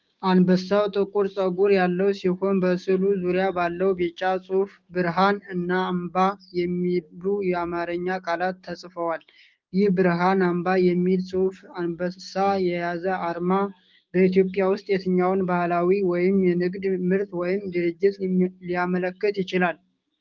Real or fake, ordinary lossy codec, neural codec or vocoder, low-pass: fake; Opus, 32 kbps; codec, 16 kHz, 6 kbps, DAC; 7.2 kHz